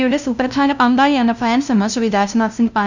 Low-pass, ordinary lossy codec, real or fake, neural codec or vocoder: 7.2 kHz; none; fake; codec, 16 kHz, 0.5 kbps, FunCodec, trained on LibriTTS, 25 frames a second